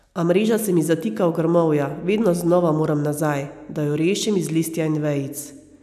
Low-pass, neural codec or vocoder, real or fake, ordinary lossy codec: 14.4 kHz; none; real; none